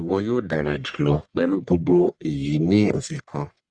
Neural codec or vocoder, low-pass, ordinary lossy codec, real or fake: codec, 44.1 kHz, 1.7 kbps, Pupu-Codec; 9.9 kHz; Opus, 64 kbps; fake